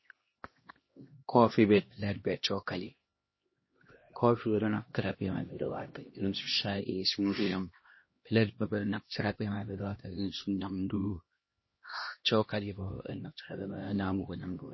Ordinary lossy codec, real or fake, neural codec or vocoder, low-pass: MP3, 24 kbps; fake; codec, 16 kHz, 1 kbps, X-Codec, HuBERT features, trained on LibriSpeech; 7.2 kHz